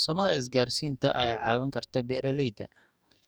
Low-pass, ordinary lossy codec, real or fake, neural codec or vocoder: none; none; fake; codec, 44.1 kHz, 2.6 kbps, DAC